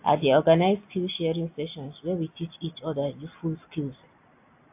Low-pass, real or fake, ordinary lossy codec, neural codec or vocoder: 3.6 kHz; real; AAC, 32 kbps; none